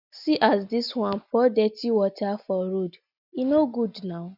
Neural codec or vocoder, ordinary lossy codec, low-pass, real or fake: none; none; 5.4 kHz; real